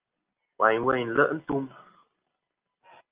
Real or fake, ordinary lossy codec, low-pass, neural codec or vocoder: real; Opus, 32 kbps; 3.6 kHz; none